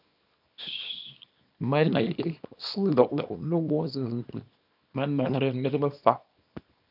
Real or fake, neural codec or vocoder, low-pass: fake; codec, 24 kHz, 0.9 kbps, WavTokenizer, small release; 5.4 kHz